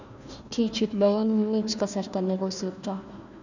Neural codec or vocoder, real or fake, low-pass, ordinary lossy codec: codec, 16 kHz, 1 kbps, FunCodec, trained on Chinese and English, 50 frames a second; fake; 7.2 kHz; none